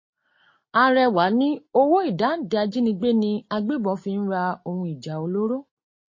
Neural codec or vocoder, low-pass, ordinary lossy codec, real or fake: none; 7.2 kHz; MP3, 32 kbps; real